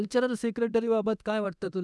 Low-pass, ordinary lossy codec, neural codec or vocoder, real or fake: none; none; codec, 24 kHz, 0.9 kbps, DualCodec; fake